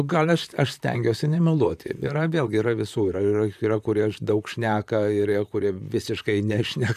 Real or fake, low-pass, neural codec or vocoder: fake; 14.4 kHz; vocoder, 44.1 kHz, 128 mel bands, Pupu-Vocoder